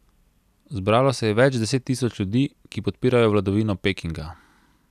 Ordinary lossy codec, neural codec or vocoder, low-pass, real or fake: none; none; 14.4 kHz; real